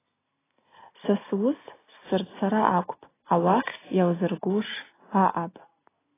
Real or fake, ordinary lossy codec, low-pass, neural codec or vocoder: real; AAC, 16 kbps; 3.6 kHz; none